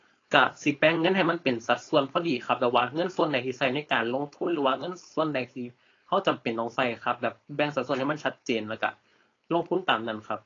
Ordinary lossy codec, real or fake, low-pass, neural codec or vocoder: AAC, 32 kbps; fake; 7.2 kHz; codec, 16 kHz, 4.8 kbps, FACodec